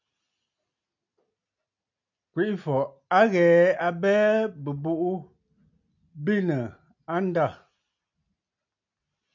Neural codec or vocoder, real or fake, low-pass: none; real; 7.2 kHz